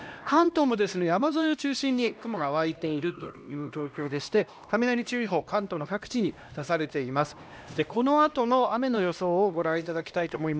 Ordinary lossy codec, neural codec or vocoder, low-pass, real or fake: none; codec, 16 kHz, 1 kbps, X-Codec, HuBERT features, trained on LibriSpeech; none; fake